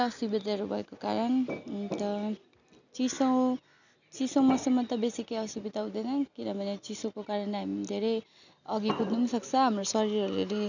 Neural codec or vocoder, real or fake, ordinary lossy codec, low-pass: none; real; none; 7.2 kHz